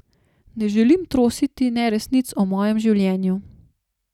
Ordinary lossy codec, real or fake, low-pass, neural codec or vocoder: none; real; 19.8 kHz; none